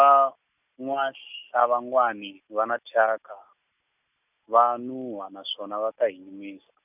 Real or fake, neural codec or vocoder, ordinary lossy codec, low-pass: real; none; none; 3.6 kHz